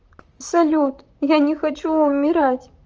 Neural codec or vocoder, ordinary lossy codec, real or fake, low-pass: vocoder, 44.1 kHz, 128 mel bands, Pupu-Vocoder; Opus, 24 kbps; fake; 7.2 kHz